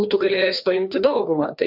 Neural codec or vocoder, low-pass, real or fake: codec, 16 kHz, 2 kbps, FreqCodec, larger model; 5.4 kHz; fake